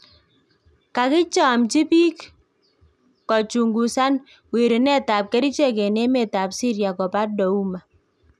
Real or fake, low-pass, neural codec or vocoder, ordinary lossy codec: real; none; none; none